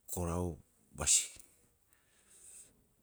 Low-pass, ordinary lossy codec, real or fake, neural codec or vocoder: none; none; real; none